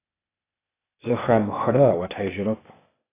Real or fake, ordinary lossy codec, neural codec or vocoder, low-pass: fake; AAC, 16 kbps; codec, 16 kHz, 0.8 kbps, ZipCodec; 3.6 kHz